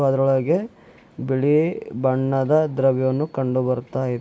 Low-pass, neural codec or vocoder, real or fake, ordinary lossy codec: none; none; real; none